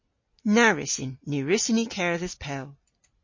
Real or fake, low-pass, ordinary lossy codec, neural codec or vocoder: real; 7.2 kHz; MP3, 32 kbps; none